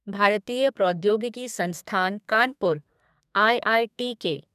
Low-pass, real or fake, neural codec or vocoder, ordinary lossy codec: 14.4 kHz; fake; codec, 32 kHz, 1.9 kbps, SNAC; none